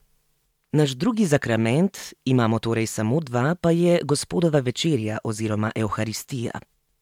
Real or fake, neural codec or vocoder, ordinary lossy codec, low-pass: fake; vocoder, 44.1 kHz, 128 mel bands every 512 samples, BigVGAN v2; MP3, 96 kbps; 19.8 kHz